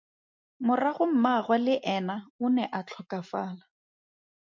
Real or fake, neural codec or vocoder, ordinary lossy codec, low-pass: real; none; MP3, 64 kbps; 7.2 kHz